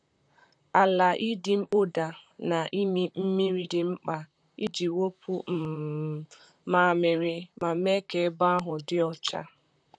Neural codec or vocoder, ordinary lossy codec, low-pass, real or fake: vocoder, 44.1 kHz, 128 mel bands, Pupu-Vocoder; none; 9.9 kHz; fake